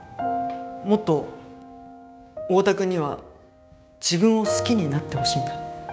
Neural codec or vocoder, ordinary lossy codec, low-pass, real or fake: codec, 16 kHz, 6 kbps, DAC; none; none; fake